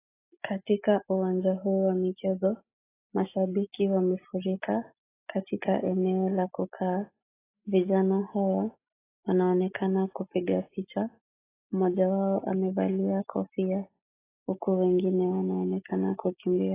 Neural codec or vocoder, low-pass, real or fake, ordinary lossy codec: none; 3.6 kHz; real; AAC, 16 kbps